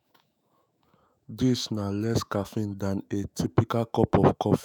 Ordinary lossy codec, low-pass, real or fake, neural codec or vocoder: none; none; fake; autoencoder, 48 kHz, 128 numbers a frame, DAC-VAE, trained on Japanese speech